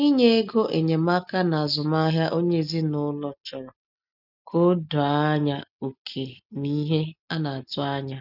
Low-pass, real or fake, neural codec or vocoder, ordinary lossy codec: 5.4 kHz; real; none; none